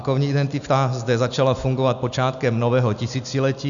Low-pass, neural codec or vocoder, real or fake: 7.2 kHz; none; real